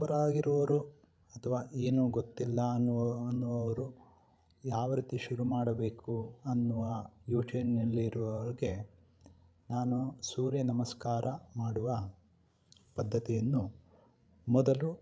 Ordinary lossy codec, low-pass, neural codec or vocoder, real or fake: none; none; codec, 16 kHz, 16 kbps, FreqCodec, larger model; fake